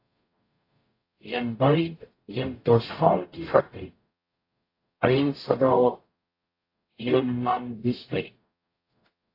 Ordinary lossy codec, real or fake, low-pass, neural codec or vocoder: AAC, 32 kbps; fake; 5.4 kHz; codec, 44.1 kHz, 0.9 kbps, DAC